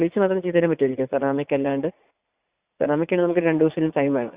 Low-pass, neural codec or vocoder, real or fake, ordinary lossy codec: 3.6 kHz; vocoder, 44.1 kHz, 80 mel bands, Vocos; fake; Opus, 64 kbps